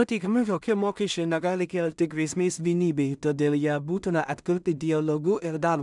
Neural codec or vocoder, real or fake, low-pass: codec, 16 kHz in and 24 kHz out, 0.4 kbps, LongCat-Audio-Codec, two codebook decoder; fake; 10.8 kHz